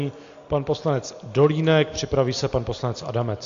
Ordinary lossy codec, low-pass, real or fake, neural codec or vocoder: AAC, 48 kbps; 7.2 kHz; real; none